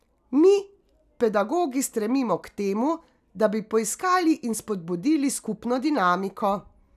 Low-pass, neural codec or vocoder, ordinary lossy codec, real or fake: 14.4 kHz; none; none; real